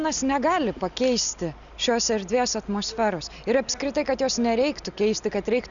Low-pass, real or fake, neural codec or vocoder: 7.2 kHz; real; none